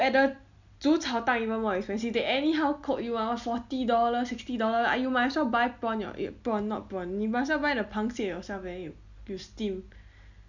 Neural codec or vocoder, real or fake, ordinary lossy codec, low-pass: none; real; none; 7.2 kHz